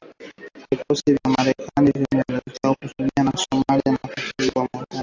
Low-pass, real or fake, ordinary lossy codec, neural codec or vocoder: 7.2 kHz; real; AAC, 48 kbps; none